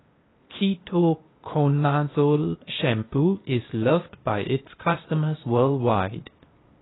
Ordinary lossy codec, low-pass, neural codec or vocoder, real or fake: AAC, 16 kbps; 7.2 kHz; codec, 16 kHz, 0.8 kbps, ZipCodec; fake